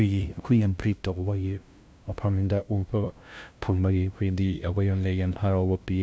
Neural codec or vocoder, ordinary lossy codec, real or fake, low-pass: codec, 16 kHz, 0.5 kbps, FunCodec, trained on LibriTTS, 25 frames a second; none; fake; none